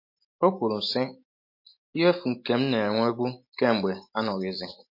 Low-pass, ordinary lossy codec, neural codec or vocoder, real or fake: 5.4 kHz; MP3, 32 kbps; none; real